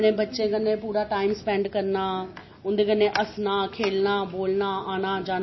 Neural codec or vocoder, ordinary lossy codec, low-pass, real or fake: none; MP3, 24 kbps; 7.2 kHz; real